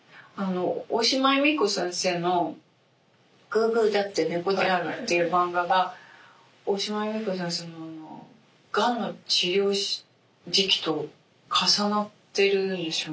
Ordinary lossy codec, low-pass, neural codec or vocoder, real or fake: none; none; none; real